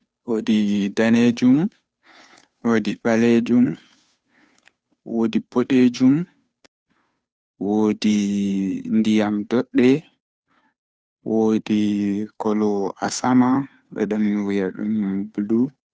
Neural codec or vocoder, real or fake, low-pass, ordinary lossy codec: codec, 16 kHz, 2 kbps, FunCodec, trained on Chinese and English, 25 frames a second; fake; none; none